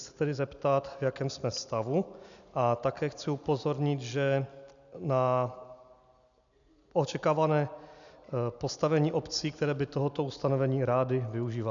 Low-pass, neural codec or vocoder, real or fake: 7.2 kHz; none; real